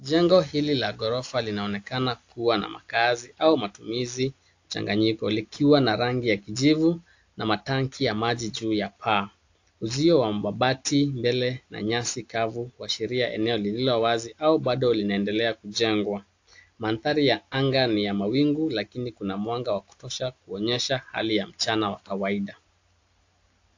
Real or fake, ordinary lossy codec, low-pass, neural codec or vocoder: real; AAC, 48 kbps; 7.2 kHz; none